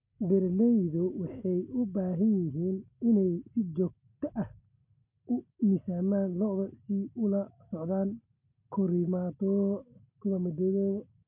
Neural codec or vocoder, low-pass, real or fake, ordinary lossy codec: none; 3.6 kHz; real; none